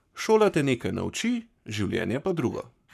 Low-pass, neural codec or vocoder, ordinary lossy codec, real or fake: 14.4 kHz; codec, 44.1 kHz, 7.8 kbps, Pupu-Codec; none; fake